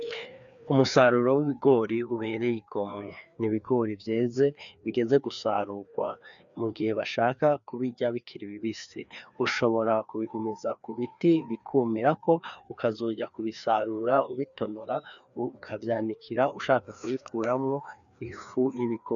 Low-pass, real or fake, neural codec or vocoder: 7.2 kHz; fake; codec, 16 kHz, 2 kbps, FreqCodec, larger model